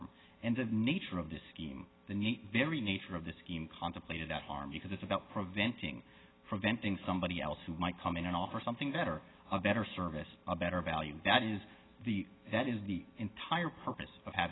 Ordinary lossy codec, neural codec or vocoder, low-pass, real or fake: AAC, 16 kbps; none; 7.2 kHz; real